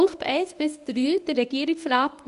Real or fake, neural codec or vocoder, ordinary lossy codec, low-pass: fake; codec, 24 kHz, 0.9 kbps, WavTokenizer, medium speech release version 1; Opus, 64 kbps; 10.8 kHz